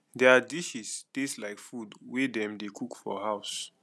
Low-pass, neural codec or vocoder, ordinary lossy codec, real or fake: none; none; none; real